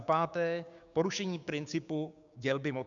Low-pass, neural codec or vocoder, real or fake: 7.2 kHz; codec, 16 kHz, 6 kbps, DAC; fake